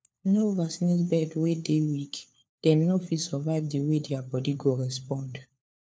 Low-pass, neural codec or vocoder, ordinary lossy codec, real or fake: none; codec, 16 kHz, 4 kbps, FunCodec, trained on LibriTTS, 50 frames a second; none; fake